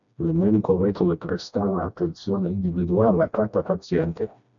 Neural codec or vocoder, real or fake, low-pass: codec, 16 kHz, 1 kbps, FreqCodec, smaller model; fake; 7.2 kHz